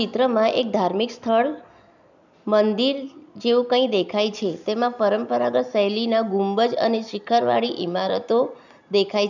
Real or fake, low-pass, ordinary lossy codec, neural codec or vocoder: real; 7.2 kHz; none; none